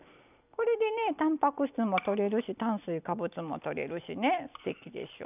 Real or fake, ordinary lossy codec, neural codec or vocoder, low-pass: fake; none; vocoder, 44.1 kHz, 128 mel bands every 256 samples, BigVGAN v2; 3.6 kHz